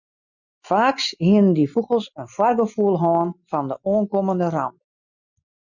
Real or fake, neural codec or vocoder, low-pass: real; none; 7.2 kHz